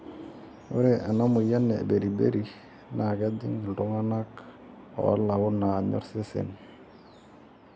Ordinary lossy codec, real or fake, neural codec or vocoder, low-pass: none; real; none; none